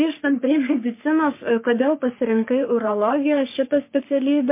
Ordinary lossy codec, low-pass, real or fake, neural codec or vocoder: MP3, 24 kbps; 3.6 kHz; fake; codec, 44.1 kHz, 3.4 kbps, Pupu-Codec